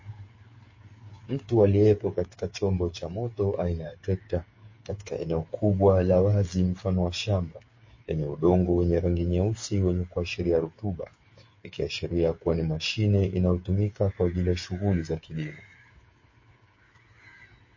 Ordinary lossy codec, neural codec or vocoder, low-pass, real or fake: MP3, 32 kbps; codec, 16 kHz, 8 kbps, FreqCodec, smaller model; 7.2 kHz; fake